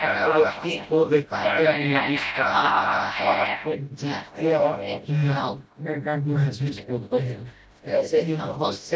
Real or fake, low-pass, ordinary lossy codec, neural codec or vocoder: fake; none; none; codec, 16 kHz, 0.5 kbps, FreqCodec, smaller model